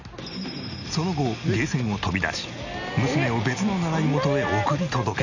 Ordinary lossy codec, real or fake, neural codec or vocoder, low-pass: none; real; none; 7.2 kHz